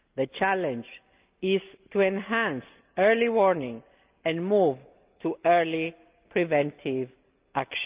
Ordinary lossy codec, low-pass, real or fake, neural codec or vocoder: Opus, 24 kbps; 3.6 kHz; real; none